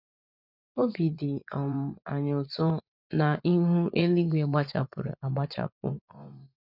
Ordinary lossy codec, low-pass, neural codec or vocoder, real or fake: none; 5.4 kHz; vocoder, 24 kHz, 100 mel bands, Vocos; fake